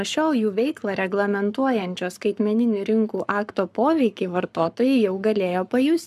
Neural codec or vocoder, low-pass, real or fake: codec, 44.1 kHz, 7.8 kbps, Pupu-Codec; 14.4 kHz; fake